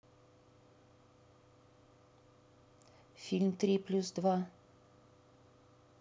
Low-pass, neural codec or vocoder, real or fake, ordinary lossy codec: none; none; real; none